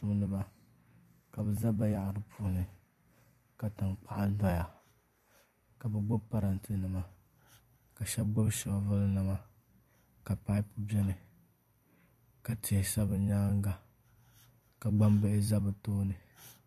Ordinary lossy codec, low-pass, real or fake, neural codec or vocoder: MP3, 64 kbps; 14.4 kHz; fake; vocoder, 44.1 kHz, 128 mel bands every 256 samples, BigVGAN v2